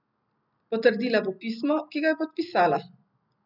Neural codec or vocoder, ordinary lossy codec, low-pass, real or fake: none; none; 5.4 kHz; real